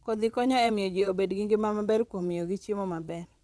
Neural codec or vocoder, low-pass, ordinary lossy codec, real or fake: vocoder, 22.05 kHz, 80 mel bands, WaveNeXt; none; none; fake